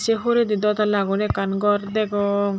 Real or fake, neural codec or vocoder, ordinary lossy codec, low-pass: real; none; none; none